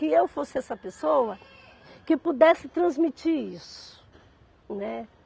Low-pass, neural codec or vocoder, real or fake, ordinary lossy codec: none; none; real; none